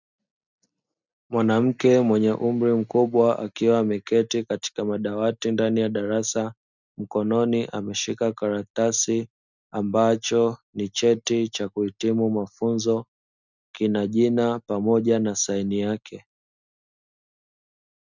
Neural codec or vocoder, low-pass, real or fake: none; 7.2 kHz; real